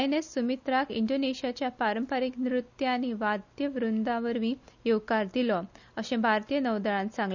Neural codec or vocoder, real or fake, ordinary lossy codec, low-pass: none; real; none; 7.2 kHz